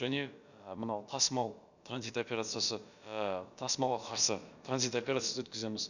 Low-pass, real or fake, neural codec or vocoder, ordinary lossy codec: 7.2 kHz; fake; codec, 16 kHz, about 1 kbps, DyCAST, with the encoder's durations; none